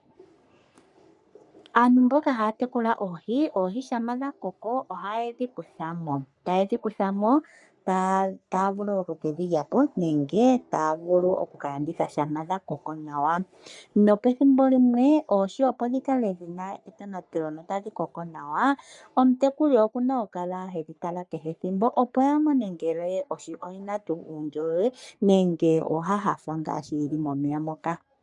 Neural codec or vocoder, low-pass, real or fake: codec, 44.1 kHz, 3.4 kbps, Pupu-Codec; 10.8 kHz; fake